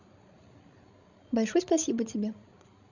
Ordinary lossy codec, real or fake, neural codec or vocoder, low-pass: none; fake; codec, 16 kHz, 16 kbps, FreqCodec, larger model; 7.2 kHz